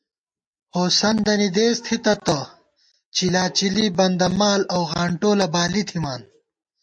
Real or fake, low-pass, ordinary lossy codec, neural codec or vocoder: real; 9.9 kHz; MP3, 48 kbps; none